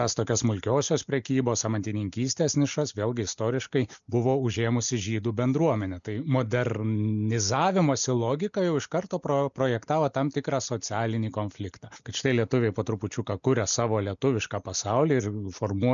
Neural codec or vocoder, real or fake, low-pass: none; real; 7.2 kHz